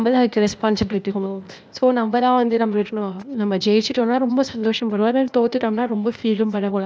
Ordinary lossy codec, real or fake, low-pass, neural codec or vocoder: none; fake; none; codec, 16 kHz, 0.8 kbps, ZipCodec